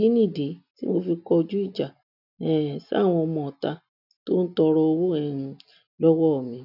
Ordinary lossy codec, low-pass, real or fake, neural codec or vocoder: none; 5.4 kHz; real; none